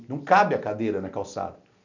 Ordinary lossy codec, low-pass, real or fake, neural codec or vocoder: none; 7.2 kHz; real; none